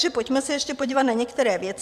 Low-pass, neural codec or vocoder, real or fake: 14.4 kHz; vocoder, 44.1 kHz, 128 mel bands every 512 samples, BigVGAN v2; fake